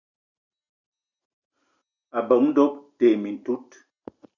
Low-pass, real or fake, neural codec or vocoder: 7.2 kHz; real; none